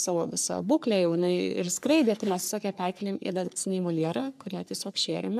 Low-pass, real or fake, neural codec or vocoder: 14.4 kHz; fake; codec, 44.1 kHz, 3.4 kbps, Pupu-Codec